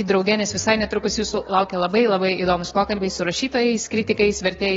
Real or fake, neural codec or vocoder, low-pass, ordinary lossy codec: fake; codec, 16 kHz, 6 kbps, DAC; 7.2 kHz; AAC, 24 kbps